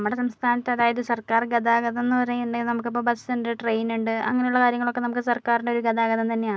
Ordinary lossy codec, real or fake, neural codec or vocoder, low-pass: none; real; none; none